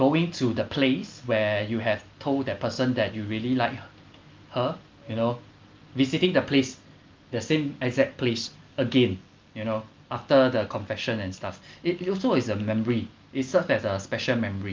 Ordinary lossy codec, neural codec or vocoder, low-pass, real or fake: Opus, 24 kbps; none; 7.2 kHz; real